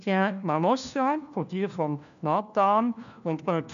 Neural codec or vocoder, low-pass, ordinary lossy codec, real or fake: codec, 16 kHz, 1 kbps, FunCodec, trained on LibriTTS, 50 frames a second; 7.2 kHz; none; fake